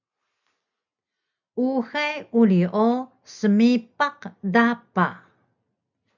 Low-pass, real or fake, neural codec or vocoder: 7.2 kHz; real; none